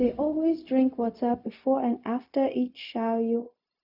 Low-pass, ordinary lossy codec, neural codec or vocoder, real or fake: 5.4 kHz; none; codec, 16 kHz, 0.4 kbps, LongCat-Audio-Codec; fake